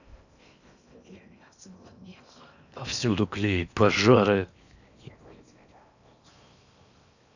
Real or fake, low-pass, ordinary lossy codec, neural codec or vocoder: fake; 7.2 kHz; none; codec, 16 kHz in and 24 kHz out, 0.8 kbps, FocalCodec, streaming, 65536 codes